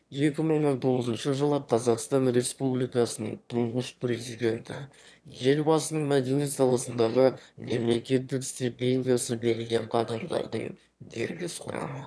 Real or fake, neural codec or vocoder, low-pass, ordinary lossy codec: fake; autoencoder, 22.05 kHz, a latent of 192 numbers a frame, VITS, trained on one speaker; none; none